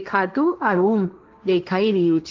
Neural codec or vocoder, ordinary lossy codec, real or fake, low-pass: codec, 16 kHz, 1.1 kbps, Voila-Tokenizer; Opus, 32 kbps; fake; 7.2 kHz